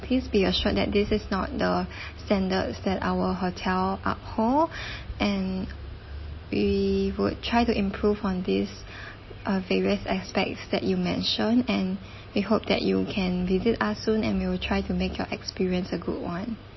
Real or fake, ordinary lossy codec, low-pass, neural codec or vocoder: real; MP3, 24 kbps; 7.2 kHz; none